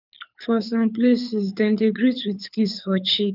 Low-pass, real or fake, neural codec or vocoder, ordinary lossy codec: 5.4 kHz; fake; codec, 24 kHz, 6 kbps, HILCodec; none